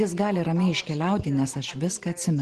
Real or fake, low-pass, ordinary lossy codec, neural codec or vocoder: real; 10.8 kHz; Opus, 16 kbps; none